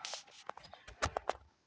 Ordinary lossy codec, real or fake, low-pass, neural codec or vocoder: none; real; none; none